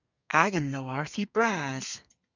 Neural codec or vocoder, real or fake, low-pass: codec, 32 kHz, 1.9 kbps, SNAC; fake; 7.2 kHz